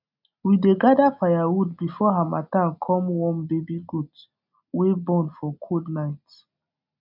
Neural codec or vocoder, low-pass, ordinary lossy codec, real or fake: none; 5.4 kHz; none; real